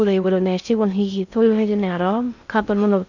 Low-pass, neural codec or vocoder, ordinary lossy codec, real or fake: 7.2 kHz; codec, 16 kHz in and 24 kHz out, 0.6 kbps, FocalCodec, streaming, 2048 codes; none; fake